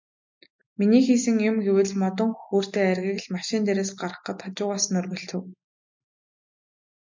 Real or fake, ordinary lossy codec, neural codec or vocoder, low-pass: real; MP3, 64 kbps; none; 7.2 kHz